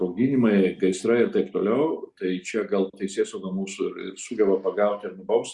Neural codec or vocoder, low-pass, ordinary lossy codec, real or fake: none; 10.8 kHz; Opus, 64 kbps; real